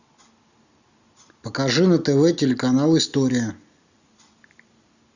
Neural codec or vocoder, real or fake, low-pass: none; real; 7.2 kHz